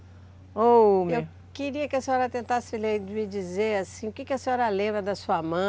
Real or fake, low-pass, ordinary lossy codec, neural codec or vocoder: real; none; none; none